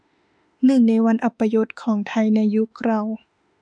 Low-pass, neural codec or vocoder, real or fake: 9.9 kHz; autoencoder, 48 kHz, 32 numbers a frame, DAC-VAE, trained on Japanese speech; fake